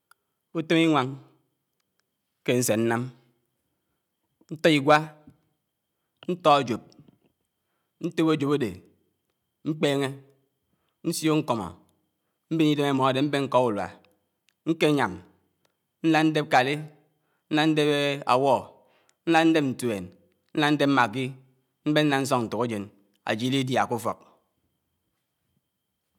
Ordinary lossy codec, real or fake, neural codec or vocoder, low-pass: none; real; none; 19.8 kHz